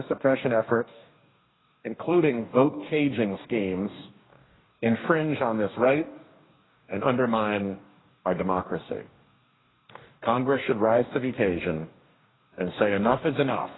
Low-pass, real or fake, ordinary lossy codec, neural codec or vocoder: 7.2 kHz; fake; AAC, 16 kbps; codec, 44.1 kHz, 2.6 kbps, DAC